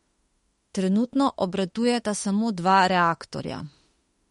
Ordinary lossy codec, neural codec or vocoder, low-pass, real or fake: MP3, 48 kbps; autoencoder, 48 kHz, 32 numbers a frame, DAC-VAE, trained on Japanese speech; 19.8 kHz; fake